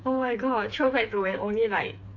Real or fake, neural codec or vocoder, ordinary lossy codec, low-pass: fake; codec, 16 kHz, 4 kbps, FreqCodec, smaller model; AAC, 48 kbps; 7.2 kHz